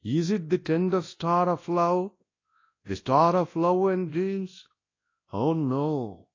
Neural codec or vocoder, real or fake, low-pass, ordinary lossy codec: codec, 24 kHz, 0.9 kbps, WavTokenizer, large speech release; fake; 7.2 kHz; AAC, 32 kbps